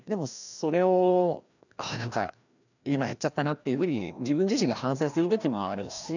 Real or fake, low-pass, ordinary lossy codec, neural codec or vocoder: fake; 7.2 kHz; none; codec, 16 kHz, 1 kbps, FreqCodec, larger model